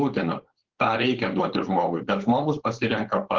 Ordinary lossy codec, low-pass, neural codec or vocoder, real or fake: Opus, 16 kbps; 7.2 kHz; codec, 16 kHz, 4.8 kbps, FACodec; fake